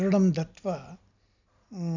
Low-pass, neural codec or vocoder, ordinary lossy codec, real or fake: 7.2 kHz; none; none; real